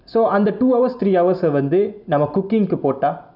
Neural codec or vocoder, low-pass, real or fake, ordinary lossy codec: none; 5.4 kHz; real; none